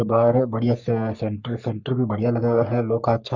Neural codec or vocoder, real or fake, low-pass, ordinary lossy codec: codec, 44.1 kHz, 3.4 kbps, Pupu-Codec; fake; 7.2 kHz; Opus, 64 kbps